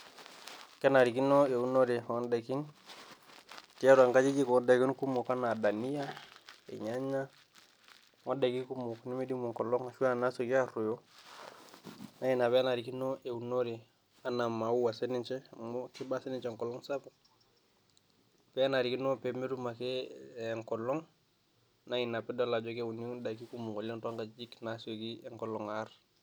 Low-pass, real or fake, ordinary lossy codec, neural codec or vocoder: none; real; none; none